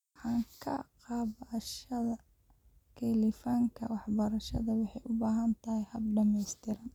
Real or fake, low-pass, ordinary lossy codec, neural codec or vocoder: real; 19.8 kHz; none; none